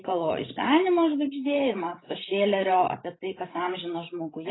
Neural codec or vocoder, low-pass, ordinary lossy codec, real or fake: codec, 16 kHz, 16 kbps, FreqCodec, larger model; 7.2 kHz; AAC, 16 kbps; fake